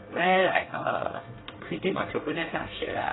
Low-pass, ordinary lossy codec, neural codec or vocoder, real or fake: 7.2 kHz; AAC, 16 kbps; codec, 24 kHz, 1 kbps, SNAC; fake